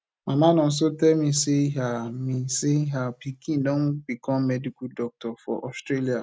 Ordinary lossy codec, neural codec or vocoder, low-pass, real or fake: none; none; none; real